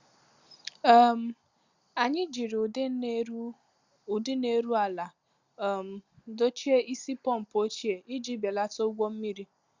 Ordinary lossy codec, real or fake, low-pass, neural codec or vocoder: Opus, 64 kbps; real; 7.2 kHz; none